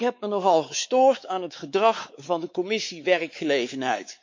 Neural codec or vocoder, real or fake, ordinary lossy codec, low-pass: codec, 16 kHz, 4 kbps, X-Codec, WavLM features, trained on Multilingual LibriSpeech; fake; MP3, 48 kbps; 7.2 kHz